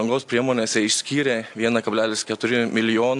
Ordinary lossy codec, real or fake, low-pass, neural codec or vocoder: AAC, 64 kbps; real; 10.8 kHz; none